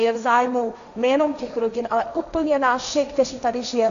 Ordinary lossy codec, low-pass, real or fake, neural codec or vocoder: AAC, 96 kbps; 7.2 kHz; fake; codec, 16 kHz, 1.1 kbps, Voila-Tokenizer